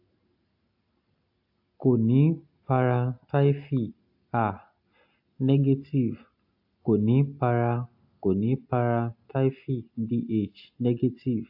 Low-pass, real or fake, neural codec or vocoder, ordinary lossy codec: 5.4 kHz; real; none; AAC, 48 kbps